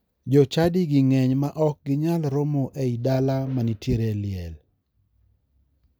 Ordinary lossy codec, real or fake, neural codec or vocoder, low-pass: none; real; none; none